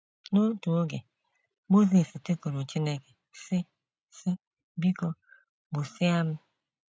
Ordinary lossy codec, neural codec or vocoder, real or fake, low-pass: none; none; real; none